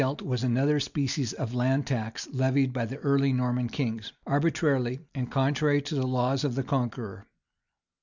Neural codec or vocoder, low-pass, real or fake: none; 7.2 kHz; real